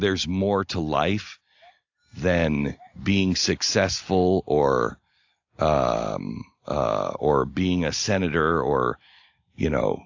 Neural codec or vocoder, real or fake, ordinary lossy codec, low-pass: none; real; AAC, 48 kbps; 7.2 kHz